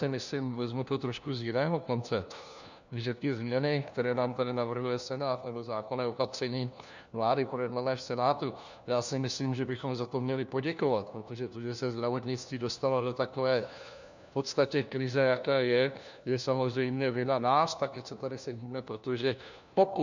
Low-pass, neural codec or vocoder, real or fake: 7.2 kHz; codec, 16 kHz, 1 kbps, FunCodec, trained on LibriTTS, 50 frames a second; fake